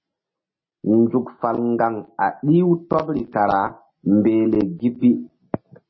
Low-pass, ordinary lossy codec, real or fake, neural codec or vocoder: 7.2 kHz; MP3, 24 kbps; real; none